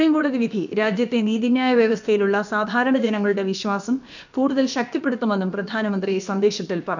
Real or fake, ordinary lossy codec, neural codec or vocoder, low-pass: fake; none; codec, 16 kHz, about 1 kbps, DyCAST, with the encoder's durations; 7.2 kHz